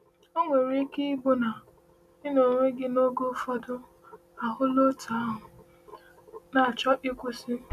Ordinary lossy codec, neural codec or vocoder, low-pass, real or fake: none; none; 14.4 kHz; real